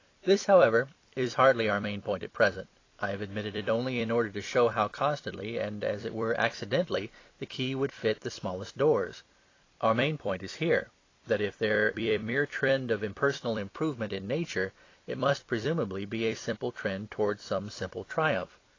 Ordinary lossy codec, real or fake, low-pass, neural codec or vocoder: AAC, 32 kbps; fake; 7.2 kHz; vocoder, 44.1 kHz, 128 mel bands every 256 samples, BigVGAN v2